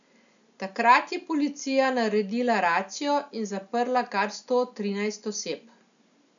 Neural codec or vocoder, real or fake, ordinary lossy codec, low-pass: none; real; none; 7.2 kHz